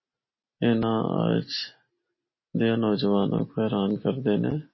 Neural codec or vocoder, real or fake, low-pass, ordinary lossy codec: none; real; 7.2 kHz; MP3, 24 kbps